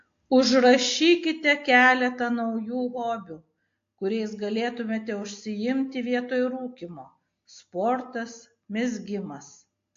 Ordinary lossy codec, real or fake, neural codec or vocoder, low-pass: AAC, 48 kbps; real; none; 7.2 kHz